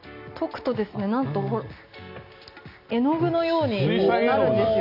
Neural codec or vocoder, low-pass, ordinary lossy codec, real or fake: none; 5.4 kHz; none; real